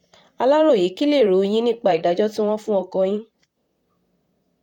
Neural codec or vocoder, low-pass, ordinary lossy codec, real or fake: vocoder, 44.1 kHz, 128 mel bands, Pupu-Vocoder; 19.8 kHz; none; fake